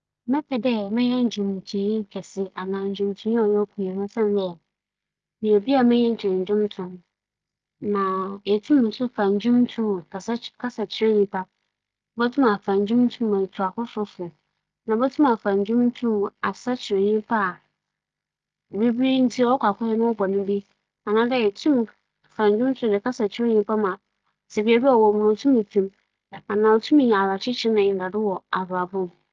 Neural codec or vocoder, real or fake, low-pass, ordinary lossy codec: none; real; 7.2 kHz; Opus, 24 kbps